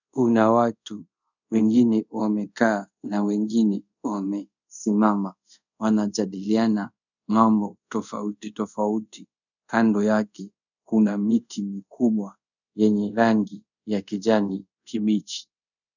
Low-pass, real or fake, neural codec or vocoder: 7.2 kHz; fake; codec, 24 kHz, 0.5 kbps, DualCodec